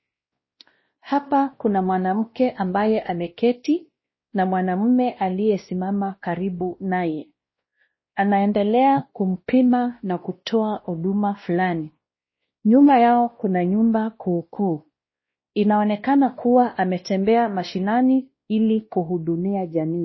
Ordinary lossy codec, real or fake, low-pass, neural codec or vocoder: MP3, 24 kbps; fake; 7.2 kHz; codec, 16 kHz, 1 kbps, X-Codec, WavLM features, trained on Multilingual LibriSpeech